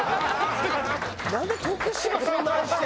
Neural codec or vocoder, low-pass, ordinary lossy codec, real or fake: none; none; none; real